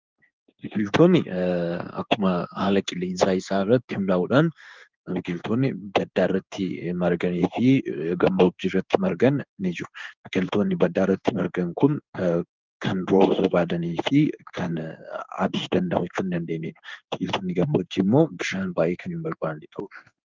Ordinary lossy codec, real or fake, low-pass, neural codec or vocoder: Opus, 24 kbps; fake; 7.2 kHz; codec, 16 kHz in and 24 kHz out, 1 kbps, XY-Tokenizer